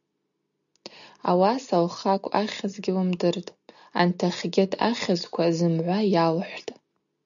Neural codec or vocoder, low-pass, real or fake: none; 7.2 kHz; real